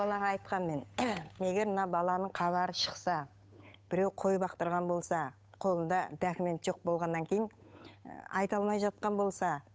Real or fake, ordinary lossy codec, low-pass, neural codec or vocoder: fake; none; none; codec, 16 kHz, 8 kbps, FunCodec, trained on Chinese and English, 25 frames a second